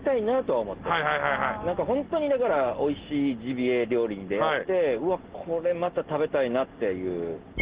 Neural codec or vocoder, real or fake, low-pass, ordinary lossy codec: none; real; 3.6 kHz; Opus, 16 kbps